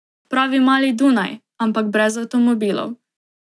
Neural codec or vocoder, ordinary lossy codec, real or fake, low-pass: none; none; real; none